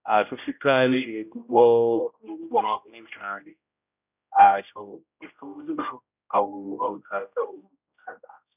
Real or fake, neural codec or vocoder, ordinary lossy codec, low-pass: fake; codec, 16 kHz, 0.5 kbps, X-Codec, HuBERT features, trained on general audio; none; 3.6 kHz